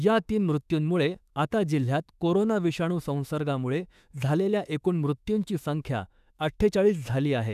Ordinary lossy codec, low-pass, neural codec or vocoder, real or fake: none; 14.4 kHz; autoencoder, 48 kHz, 32 numbers a frame, DAC-VAE, trained on Japanese speech; fake